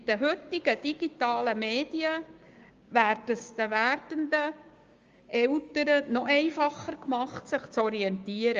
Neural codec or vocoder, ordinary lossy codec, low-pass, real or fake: codec, 16 kHz, 6 kbps, DAC; Opus, 32 kbps; 7.2 kHz; fake